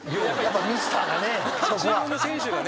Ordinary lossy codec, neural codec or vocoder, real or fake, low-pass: none; none; real; none